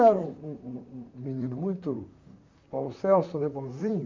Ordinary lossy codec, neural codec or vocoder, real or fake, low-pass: MP3, 64 kbps; vocoder, 44.1 kHz, 128 mel bands, Pupu-Vocoder; fake; 7.2 kHz